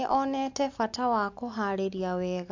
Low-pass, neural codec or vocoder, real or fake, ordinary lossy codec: 7.2 kHz; none; real; AAC, 48 kbps